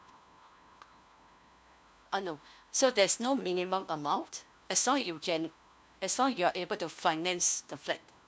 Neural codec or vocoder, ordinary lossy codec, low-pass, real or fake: codec, 16 kHz, 1 kbps, FunCodec, trained on LibriTTS, 50 frames a second; none; none; fake